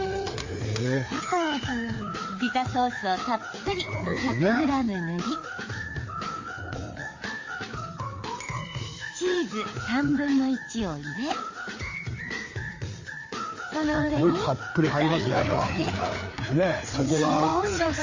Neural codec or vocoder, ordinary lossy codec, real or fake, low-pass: codec, 16 kHz, 4 kbps, FreqCodec, larger model; MP3, 32 kbps; fake; 7.2 kHz